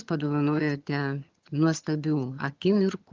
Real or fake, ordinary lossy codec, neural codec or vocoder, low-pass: fake; Opus, 32 kbps; vocoder, 22.05 kHz, 80 mel bands, HiFi-GAN; 7.2 kHz